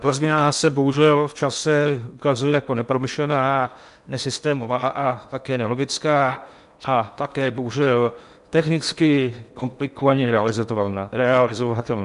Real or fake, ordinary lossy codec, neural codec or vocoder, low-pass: fake; MP3, 96 kbps; codec, 16 kHz in and 24 kHz out, 0.8 kbps, FocalCodec, streaming, 65536 codes; 10.8 kHz